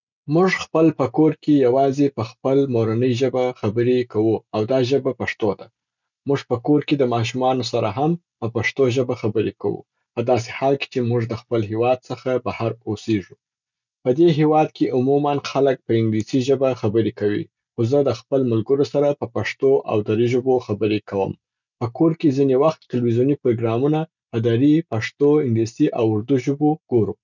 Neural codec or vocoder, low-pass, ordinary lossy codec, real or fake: none; 7.2 kHz; none; real